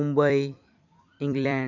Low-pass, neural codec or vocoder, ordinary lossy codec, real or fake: 7.2 kHz; vocoder, 44.1 kHz, 128 mel bands every 256 samples, BigVGAN v2; none; fake